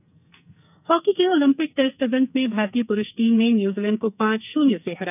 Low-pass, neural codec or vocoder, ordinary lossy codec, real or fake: 3.6 kHz; codec, 44.1 kHz, 2.6 kbps, SNAC; none; fake